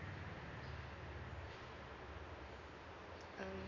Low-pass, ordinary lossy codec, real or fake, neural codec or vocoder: 7.2 kHz; none; real; none